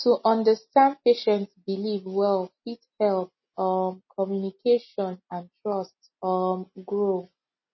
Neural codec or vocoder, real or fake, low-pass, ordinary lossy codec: none; real; 7.2 kHz; MP3, 24 kbps